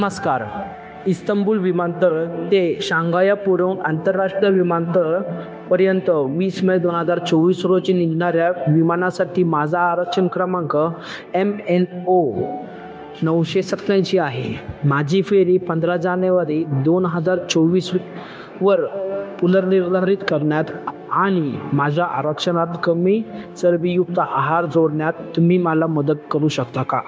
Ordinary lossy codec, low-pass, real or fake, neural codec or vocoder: none; none; fake; codec, 16 kHz, 0.9 kbps, LongCat-Audio-Codec